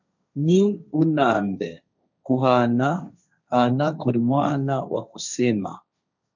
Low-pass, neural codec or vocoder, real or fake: 7.2 kHz; codec, 16 kHz, 1.1 kbps, Voila-Tokenizer; fake